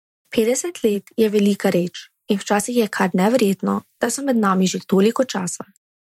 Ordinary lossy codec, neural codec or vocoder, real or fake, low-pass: MP3, 64 kbps; vocoder, 44.1 kHz, 128 mel bands every 512 samples, BigVGAN v2; fake; 19.8 kHz